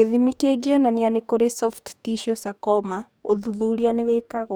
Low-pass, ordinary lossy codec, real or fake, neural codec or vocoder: none; none; fake; codec, 44.1 kHz, 2.6 kbps, DAC